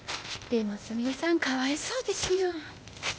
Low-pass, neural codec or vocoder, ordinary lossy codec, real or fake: none; codec, 16 kHz, 0.8 kbps, ZipCodec; none; fake